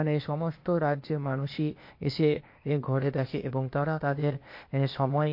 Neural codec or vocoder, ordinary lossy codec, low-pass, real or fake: codec, 16 kHz, 0.8 kbps, ZipCodec; MP3, 32 kbps; 5.4 kHz; fake